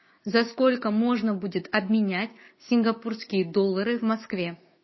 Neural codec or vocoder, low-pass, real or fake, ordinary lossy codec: codec, 16 kHz, 6 kbps, DAC; 7.2 kHz; fake; MP3, 24 kbps